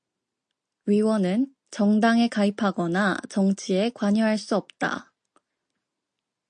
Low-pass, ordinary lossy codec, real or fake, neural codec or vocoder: 9.9 kHz; MP3, 48 kbps; real; none